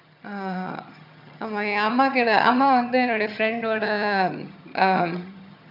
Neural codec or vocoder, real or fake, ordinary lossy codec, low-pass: vocoder, 22.05 kHz, 80 mel bands, HiFi-GAN; fake; none; 5.4 kHz